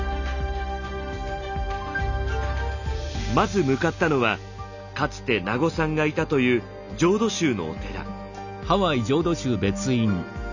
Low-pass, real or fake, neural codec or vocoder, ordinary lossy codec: 7.2 kHz; real; none; none